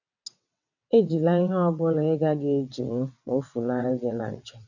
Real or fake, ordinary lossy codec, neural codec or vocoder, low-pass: fake; none; vocoder, 22.05 kHz, 80 mel bands, WaveNeXt; 7.2 kHz